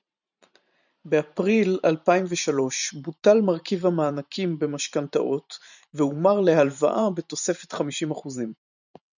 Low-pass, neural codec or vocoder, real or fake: 7.2 kHz; vocoder, 44.1 kHz, 128 mel bands every 512 samples, BigVGAN v2; fake